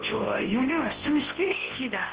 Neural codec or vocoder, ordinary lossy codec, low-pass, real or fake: codec, 24 kHz, 0.9 kbps, WavTokenizer, large speech release; Opus, 16 kbps; 3.6 kHz; fake